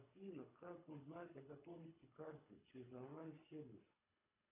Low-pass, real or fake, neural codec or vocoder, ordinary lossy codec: 3.6 kHz; fake; codec, 24 kHz, 3 kbps, HILCodec; AAC, 32 kbps